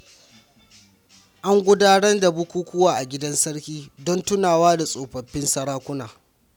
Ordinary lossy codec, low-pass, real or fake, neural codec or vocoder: none; 19.8 kHz; real; none